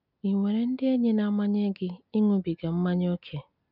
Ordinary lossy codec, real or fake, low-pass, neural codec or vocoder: none; real; 5.4 kHz; none